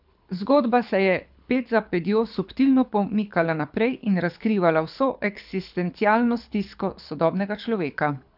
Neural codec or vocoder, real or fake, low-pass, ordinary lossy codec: codec, 24 kHz, 6 kbps, HILCodec; fake; 5.4 kHz; none